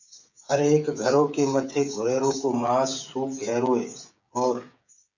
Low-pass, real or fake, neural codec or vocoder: 7.2 kHz; fake; codec, 16 kHz, 8 kbps, FreqCodec, smaller model